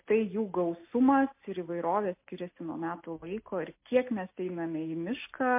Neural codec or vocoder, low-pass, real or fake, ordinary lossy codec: none; 3.6 kHz; real; MP3, 24 kbps